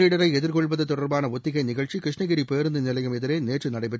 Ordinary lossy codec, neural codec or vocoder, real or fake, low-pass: none; none; real; none